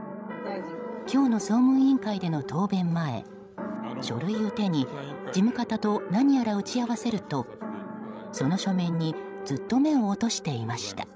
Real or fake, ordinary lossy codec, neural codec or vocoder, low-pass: fake; none; codec, 16 kHz, 16 kbps, FreqCodec, larger model; none